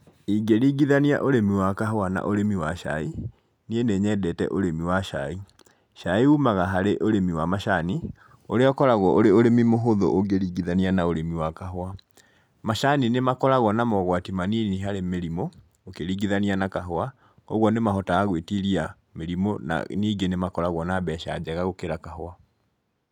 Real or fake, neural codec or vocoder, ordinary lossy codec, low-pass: real; none; none; 19.8 kHz